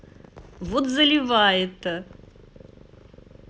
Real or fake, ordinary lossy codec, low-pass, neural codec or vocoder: real; none; none; none